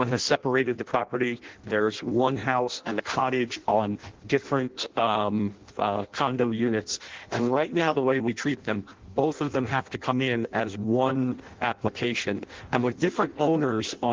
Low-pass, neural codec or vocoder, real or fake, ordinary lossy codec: 7.2 kHz; codec, 16 kHz in and 24 kHz out, 0.6 kbps, FireRedTTS-2 codec; fake; Opus, 16 kbps